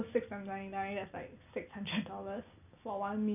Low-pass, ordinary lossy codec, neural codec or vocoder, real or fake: 3.6 kHz; none; none; real